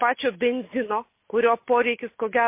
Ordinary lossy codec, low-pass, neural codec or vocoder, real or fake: MP3, 24 kbps; 3.6 kHz; none; real